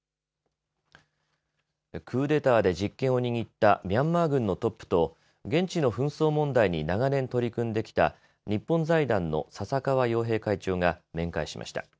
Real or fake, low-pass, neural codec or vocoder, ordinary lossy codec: real; none; none; none